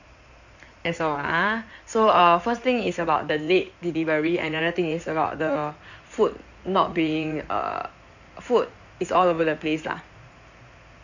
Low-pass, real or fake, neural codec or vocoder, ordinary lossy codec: 7.2 kHz; fake; codec, 16 kHz in and 24 kHz out, 2.2 kbps, FireRedTTS-2 codec; none